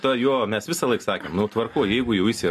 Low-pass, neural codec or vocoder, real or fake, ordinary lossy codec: 14.4 kHz; none; real; MP3, 64 kbps